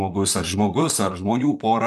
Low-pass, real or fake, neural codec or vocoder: 14.4 kHz; fake; vocoder, 44.1 kHz, 128 mel bands, Pupu-Vocoder